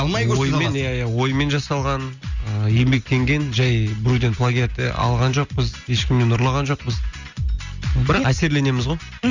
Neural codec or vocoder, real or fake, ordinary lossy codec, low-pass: none; real; Opus, 64 kbps; 7.2 kHz